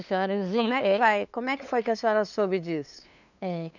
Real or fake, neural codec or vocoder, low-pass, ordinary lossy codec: fake; codec, 16 kHz, 2 kbps, FunCodec, trained on LibriTTS, 25 frames a second; 7.2 kHz; none